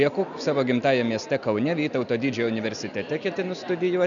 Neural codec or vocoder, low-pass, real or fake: none; 7.2 kHz; real